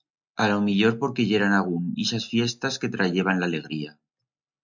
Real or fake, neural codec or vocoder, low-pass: real; none; 7.2 kHz